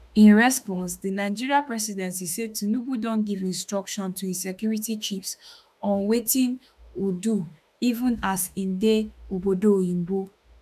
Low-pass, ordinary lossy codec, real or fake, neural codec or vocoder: 14.4 kHz; none; fake; autoencoder, 48 kHz, 32 numbers a frame, DAC-VAE, trained on Japanese speech